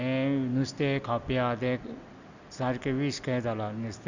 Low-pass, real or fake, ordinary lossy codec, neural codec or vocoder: 7.2 kHz; real; Opus, 64 kbps; none